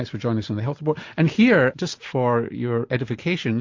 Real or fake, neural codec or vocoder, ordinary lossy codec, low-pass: real; none; MP3, 48 kbps; 7.2 kHz